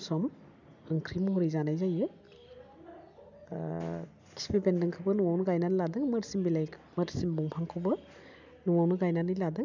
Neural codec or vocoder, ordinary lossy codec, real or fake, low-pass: none; none; real; 7.2 kHz